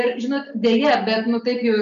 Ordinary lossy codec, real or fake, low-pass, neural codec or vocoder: MP3, 64 kbps; real; 7.2 kHz; none